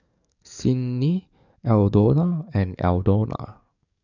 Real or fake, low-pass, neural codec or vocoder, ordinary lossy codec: fake; 7.2 kHz; codec, 44.1 kHz, 7.8 kbps, DAC; none